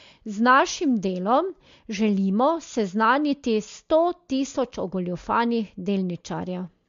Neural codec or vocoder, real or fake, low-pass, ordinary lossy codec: none; real; 7.2 kHz; MP3, 48 kbps